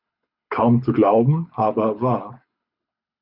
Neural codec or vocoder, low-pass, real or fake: codec, 24 kHz, 6 kbps, HILCodec; 5.4 kHz; fake